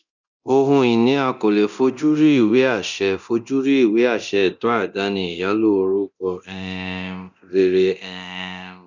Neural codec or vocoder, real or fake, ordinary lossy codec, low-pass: codec, 24 kHz, 0.9 kbps, DualCodec; fake; none; 7.2 kHz